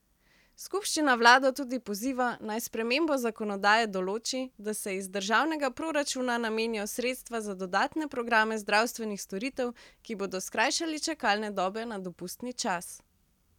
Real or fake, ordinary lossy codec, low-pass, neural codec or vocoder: real; none; 19.8 kHz; none